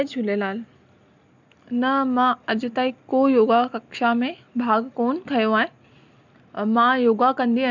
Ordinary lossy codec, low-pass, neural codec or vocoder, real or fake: none; 7.2 kHz; none; real